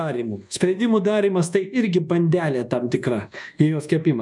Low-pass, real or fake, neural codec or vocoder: 10.8 kHz; fake; codec, 24 kHz, 1.2 kbps, DualCodec